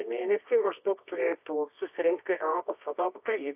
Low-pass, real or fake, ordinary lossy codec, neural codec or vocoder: 3.6 kHz; fake; AAC, 32 kbps; codec, 24 kHz, 0.9 kbps, WavTokenizer, medium music audio release